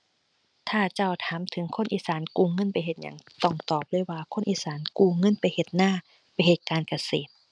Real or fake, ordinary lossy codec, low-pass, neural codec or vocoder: real; none; 9.9 kHz; none